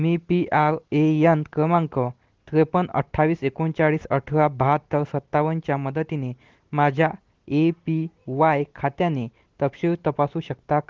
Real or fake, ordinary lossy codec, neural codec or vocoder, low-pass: real; Opus, 16 kbps; none; 7.2 kHz